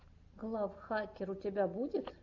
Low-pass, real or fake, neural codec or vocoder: 7.2 kHz; real; none